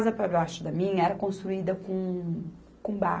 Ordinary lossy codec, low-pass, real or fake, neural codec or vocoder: none; none; real; none